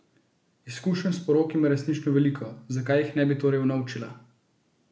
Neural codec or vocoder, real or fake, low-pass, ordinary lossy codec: none; real; none; none